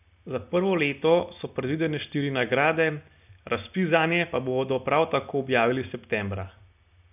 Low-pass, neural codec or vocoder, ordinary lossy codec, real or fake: 3.6 kHz; none; none; real